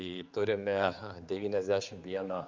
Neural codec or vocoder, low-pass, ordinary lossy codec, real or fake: codec, 16 kHz, 4 kbps, X-Codec, HuBERT features, trained on general audio; 7.2 kHz; Opus, 32 kbps; fake